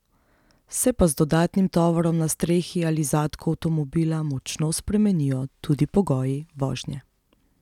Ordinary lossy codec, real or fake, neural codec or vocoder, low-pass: none; real; none; 19.8 kHz